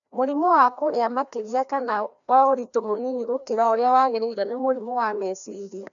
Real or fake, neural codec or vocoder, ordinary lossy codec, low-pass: fake; codec, 16 kHz, 1 kbps, FreqCodec, larger model; none; 7.2 kHz